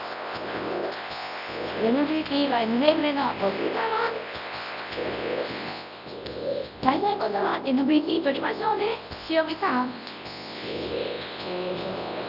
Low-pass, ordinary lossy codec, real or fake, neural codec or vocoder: 5.4 kHz; none; fake; codec, 24 kHz, 0.9 kbps, WavTokenizer, large speech release